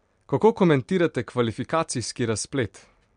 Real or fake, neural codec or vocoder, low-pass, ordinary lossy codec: real; none; 9.9 kHz; MP3, 64 kbps